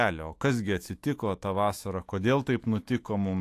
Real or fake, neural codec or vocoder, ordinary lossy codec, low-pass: fake; autoencoder, 48 kHz, 128 numbers a frame, DAC-VAE, trained on Japanese speech; AAC, 64 kbps; 14.4 kHz